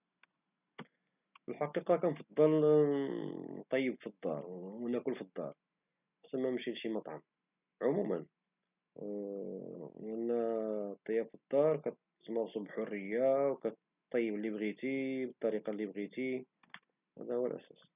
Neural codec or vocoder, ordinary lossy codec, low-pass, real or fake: none; none; 3.6 kHz; real